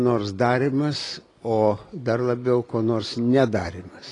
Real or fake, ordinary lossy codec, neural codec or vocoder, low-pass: real; AAC, 32 kbps; none; 10.8 kHz